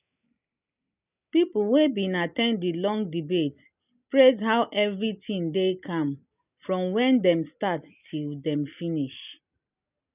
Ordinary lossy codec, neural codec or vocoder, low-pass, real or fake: none; none; 3.6 kHz; real